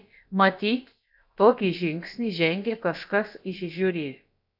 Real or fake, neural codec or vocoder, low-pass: fake; codec, 16 kHz, about 1 kbps, DyCAST, with the encoder's durations; 5.4 kHz